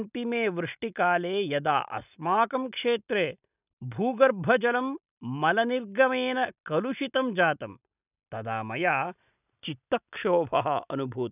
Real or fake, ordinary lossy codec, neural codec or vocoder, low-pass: real; none; none; 3.6 kHz